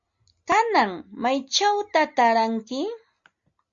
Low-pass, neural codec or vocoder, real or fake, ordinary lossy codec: 7.2 kHz; none; real; Opus, 64 kbps